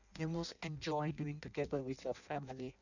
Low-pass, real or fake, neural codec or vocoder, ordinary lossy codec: 7.2 kHz; fake; codec, 16 kHz in and 24 kHz out, 0.6 kbps, FireRedTTS-2 codec; none